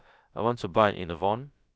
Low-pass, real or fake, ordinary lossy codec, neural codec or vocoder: none; fake; none; codec, 16 kHz, about 1 kbps, DyCAST, with the encoder's durations